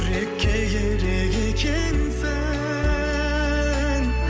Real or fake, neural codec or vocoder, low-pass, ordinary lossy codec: real; none; none; none